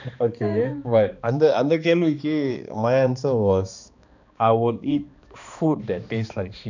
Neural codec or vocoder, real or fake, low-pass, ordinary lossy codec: codec, 16 kHz, 2 kbps, X-Codec, HuBERT features, trained on balanced general audio; fake; 7.2 kHz; none